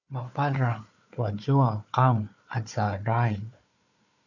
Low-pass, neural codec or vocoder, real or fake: 7.2 kHz; codec, 16 kHz, 4 kbps, FunCodec, trained on Chinese and English, 50 frames a second; fake